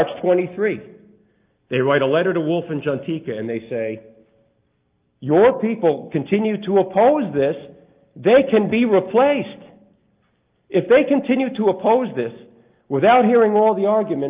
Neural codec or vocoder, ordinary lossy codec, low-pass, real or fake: none; Opus, 24 kbps; 3.6 kHz; real